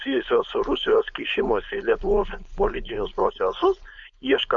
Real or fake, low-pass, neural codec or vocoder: fake; 7.2 kHz; codec, 16 kHz, 4.8 kbps, FACodec